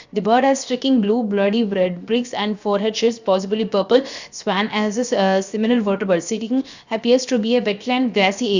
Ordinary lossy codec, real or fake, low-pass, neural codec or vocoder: Opus, 64 kbps; fake; 7.2 kHz; codec, 16 kHz, 0.7 kbps, FocalCodec